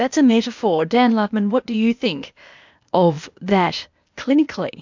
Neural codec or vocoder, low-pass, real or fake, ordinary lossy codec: codec, 16 kHz, 0.8 kbps, ZipCodec; 7.2 kHz; fake; MP3, 64 kbps